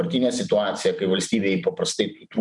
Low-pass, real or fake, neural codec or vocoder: 10.8 kHz; fake; vocoder, 44.1 kHz, 128 mel bands every 256 samples, BigVGAN v2